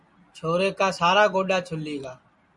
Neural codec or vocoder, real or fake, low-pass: none; real; 10.8 kHz